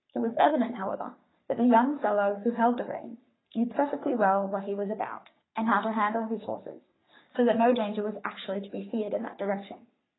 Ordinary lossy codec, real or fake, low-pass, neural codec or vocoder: AAC, 16 kbps; fake; 7.2 kHz; codec, 44.1 kHz, 3.4 kbps, Pupu-Codec